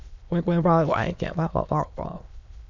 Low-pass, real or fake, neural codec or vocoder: 7.2 kHz; fake; autoencoder, 22.05 kHz, a latent of 192 numbers a frame, VITS, trained on many speakers